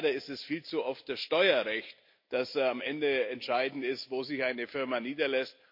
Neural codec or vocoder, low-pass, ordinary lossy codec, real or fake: none; 5.4 kHz; MP3, 32 kbps; real